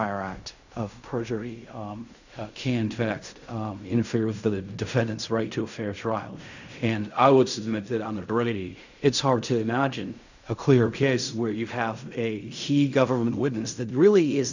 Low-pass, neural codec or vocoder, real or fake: 7.2 kHz; codec, 16 kHz in and 24 kHz out, 0.4 kbps, LongCat-Audio-Codec, fine tuned four codebook decoder; fake